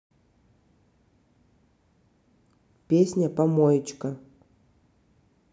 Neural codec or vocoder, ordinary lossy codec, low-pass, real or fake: none; none; none; real